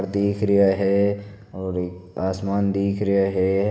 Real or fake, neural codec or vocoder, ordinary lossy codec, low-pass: real; none; none; none